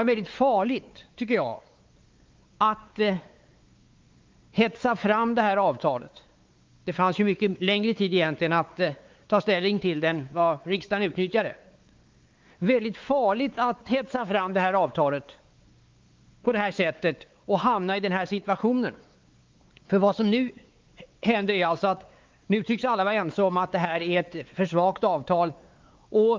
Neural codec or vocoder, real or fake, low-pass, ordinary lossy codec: codec, 16 kHz, 4 kbps, X-Codec, WavLM features, trained on Multilingual LibriSpeech; fake; 7.2 kHz; Opus, 32 kbps